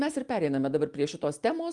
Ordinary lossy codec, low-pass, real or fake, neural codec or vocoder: Opus, 32 kbps; 10.8 kHz; real; none